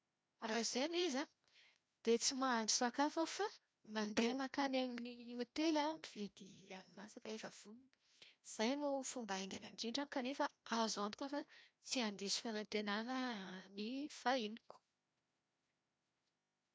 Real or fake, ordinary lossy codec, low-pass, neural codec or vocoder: fake; none; none; codec, 16 kHz, 1 kbps, FreqCodec, larger model